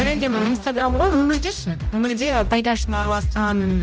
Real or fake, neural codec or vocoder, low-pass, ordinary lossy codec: fake; codec, 16 kHz, 0.5 kbps, X-Codec, HuBERT features, trained on general audio; none; none